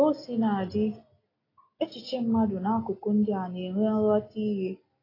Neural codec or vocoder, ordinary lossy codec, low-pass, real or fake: none; AAC, 24 kbps; 5.4 kHz; real